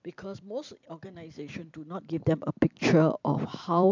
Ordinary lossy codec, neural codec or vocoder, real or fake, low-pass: MP3, 64 kbps; vocoder, 44.1 kHz, 128 mel bands every 256 samples, BigVGAN v2; fake; 7.2 kHz